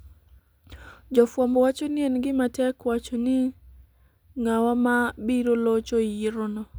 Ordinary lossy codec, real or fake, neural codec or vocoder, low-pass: none; real; none; none